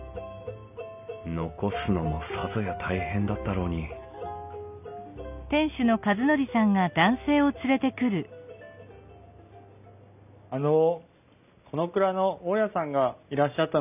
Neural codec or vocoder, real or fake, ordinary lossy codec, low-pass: none; real; none; 3.6 kHz